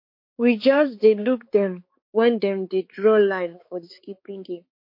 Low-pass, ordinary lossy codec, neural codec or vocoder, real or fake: 5.4 kHz; MP3, 32 kbps; codec, 16 kHz, 4 kbps, X-Codec, HuBERT features, trained on balanced general audio; fake